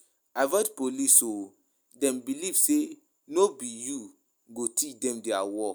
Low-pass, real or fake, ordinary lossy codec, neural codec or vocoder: none; real; none; none